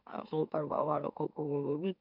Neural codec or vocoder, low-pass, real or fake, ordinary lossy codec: autoencoder, 44.1 kHz, a latent of 192 numbers a frame, MeloTTS; 5.4 kHz; fake; none